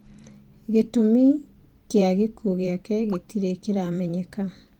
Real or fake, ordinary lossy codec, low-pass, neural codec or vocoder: fake; Opus, 32 kbps; 19.8 kHz; vocoder, 44.1 kHz, 128 mel bands every 512 samples, BigVGAN v2